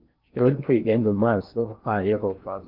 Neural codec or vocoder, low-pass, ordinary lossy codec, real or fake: codec, 16 kHz in and 24 kHz out, 0.8 kbps, FocalCodec, streaming, 65536 codes; 5.4 kHz; Opus, 32 kbps; fake